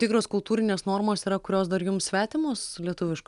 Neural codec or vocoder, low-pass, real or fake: none; 10.8 kHz; real